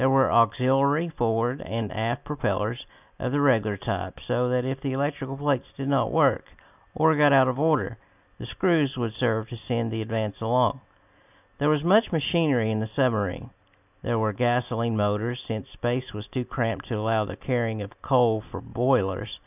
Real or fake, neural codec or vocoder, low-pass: real; none; 3.6 kHz